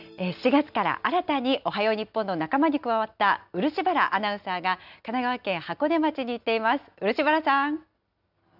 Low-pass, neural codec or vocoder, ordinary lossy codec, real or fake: 5.4 kHz; none; none; real